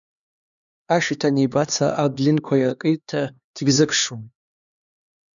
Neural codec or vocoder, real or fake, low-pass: codec, 16 kHz, 2 kbps, X-Codec, HuBERT features, trained on LibriSpeech; fake; 7.2 kHz